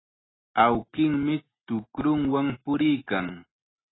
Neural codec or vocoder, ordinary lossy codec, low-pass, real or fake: none; AAC, 16 kbps; 7.2 kHz; real